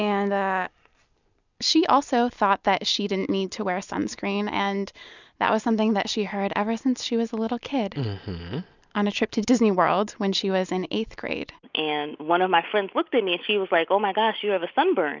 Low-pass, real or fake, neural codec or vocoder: 7.2 kHz; real; none